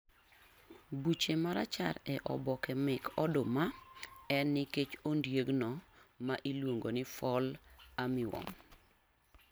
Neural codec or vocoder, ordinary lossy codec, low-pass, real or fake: none; none; none; real